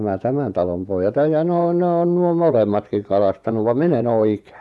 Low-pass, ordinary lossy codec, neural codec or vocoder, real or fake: none; none; vocoder, 24 kHz, 100 mel bands, Vocos; fake